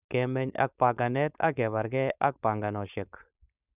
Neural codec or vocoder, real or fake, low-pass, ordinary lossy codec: codec, 16 kHz, 4.8 kbps, FACodec; fake; 3.6 kHz; none